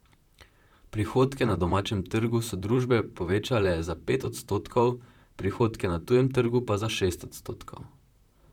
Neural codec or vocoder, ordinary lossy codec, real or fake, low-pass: vocoder, 44.1 kHz, 128 mel bands, Pupu-Vocoder; none; fake; 19.8 kHz